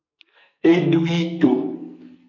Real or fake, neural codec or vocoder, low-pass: fake; codec, 44.1 kHz, 2.6 kbps, SNAC; 7.2 kHz